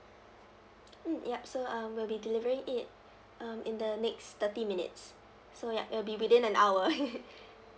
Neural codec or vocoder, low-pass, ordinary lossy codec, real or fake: none; none; none; real